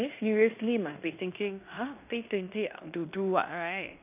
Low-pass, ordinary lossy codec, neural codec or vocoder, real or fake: 3.6 kHz; none; codec, 16 kHz in and 24 kHz out, 0.9 kbps, LongCat-Audio-Codec, fine tuned four codebook decoder; fake